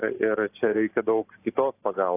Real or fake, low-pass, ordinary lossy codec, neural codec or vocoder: real; 3.6 kHz; AAC, 24 kbps; none